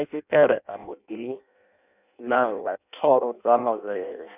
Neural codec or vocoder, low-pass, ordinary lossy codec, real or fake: codec, 16 kHz in and 24 kHz out, 0.6 kbps, FireRedTTS-2 codec; 3.6 kHz; none; fake